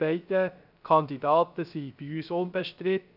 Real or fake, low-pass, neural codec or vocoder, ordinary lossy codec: fake; 5.4 kHz; codec, 16 kHz, 0.3 kbps, FocalCodec; none